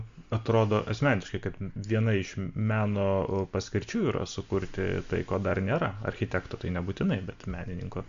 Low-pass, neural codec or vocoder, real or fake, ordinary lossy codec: 7.2 kHz; none; real; MP3, 64 kbps